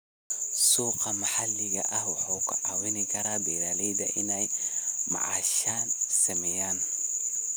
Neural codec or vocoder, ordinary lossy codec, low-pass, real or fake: none; none; none; real